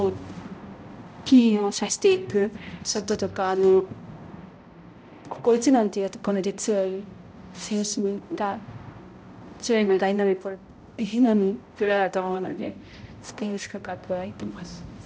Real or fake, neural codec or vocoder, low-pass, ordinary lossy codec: fake; codec, 16 kHz, 0.5 kbps, X-Codec, HuBERT features, trained on balanced general audio; none; none